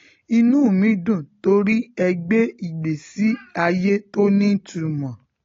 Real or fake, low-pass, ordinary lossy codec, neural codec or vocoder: real; 7.2 kHz; AAC, 32 kbps; none